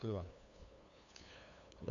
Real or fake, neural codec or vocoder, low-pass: fake; codec, 16 kHz, 2 kbps, FunCodec, trained on LibriTTS, 25 frames a second; 7.2 kHz